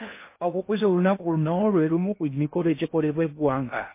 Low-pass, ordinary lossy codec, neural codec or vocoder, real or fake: 3.6 kHz; MP3, 24 kbps; codec, 16 kHz in and 24 kHz out, 0.6 kbps, FocalCodec, streaming, 2048 codes; fake